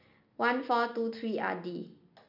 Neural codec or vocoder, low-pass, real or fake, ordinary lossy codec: none; 5.4 kHz; real; none